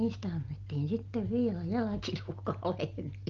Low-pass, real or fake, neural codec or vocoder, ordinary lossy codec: 7.2 kHz; real; none; Opus, 32 kbps